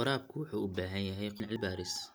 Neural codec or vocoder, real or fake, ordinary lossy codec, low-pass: none; real; none; none